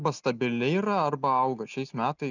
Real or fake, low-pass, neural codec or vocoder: real; 7.2 kHz; none